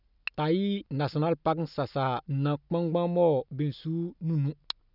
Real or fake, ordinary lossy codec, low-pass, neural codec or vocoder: real; none; 5.4 kHz; none